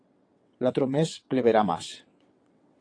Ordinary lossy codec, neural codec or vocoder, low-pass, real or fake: AAC, 48 kbps; vocoder, 22.05 kHz, 80 mel bands, WaveNeXt; 9.9 kHz; fake